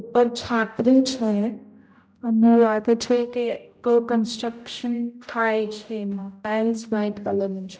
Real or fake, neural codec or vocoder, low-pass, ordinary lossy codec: fake; codec, 16 kHz, 0.5 kbps, X-Codec, HuBERT features, trained on general audio; none; none